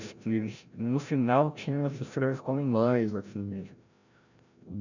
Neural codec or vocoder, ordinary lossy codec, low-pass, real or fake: codec, 16 kHz, 0.5 kbps, FreqCodec, larger model; none; 7.2 kHz; fake